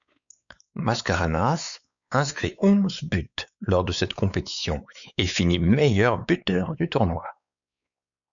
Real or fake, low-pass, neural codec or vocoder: fake; 7.2 kHz; codec, 16 kHz, 4 kbps, X-Codec, WavLM features, trained on Multilingual LibriSpeech